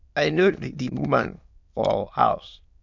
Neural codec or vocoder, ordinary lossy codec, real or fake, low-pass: autoencoder, 22.05 kHz, a latent of 192 numbers a frame, VITS, trained on many speakers; MP3, 64 kbps; fake; 7.2 kHz